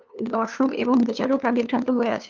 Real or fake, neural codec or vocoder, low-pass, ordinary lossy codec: fake; codec, 16 kHz, 2 kbps, FunCodec, trained on LibriTTS, 25 frames a second; 7.2 kHz; Opus, 24 kbps